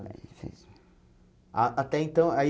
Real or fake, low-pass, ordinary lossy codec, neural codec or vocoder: real; none; none; none